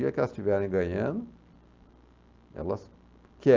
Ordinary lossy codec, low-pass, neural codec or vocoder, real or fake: Opus, 24 kbps; 7.2 kHz; none; real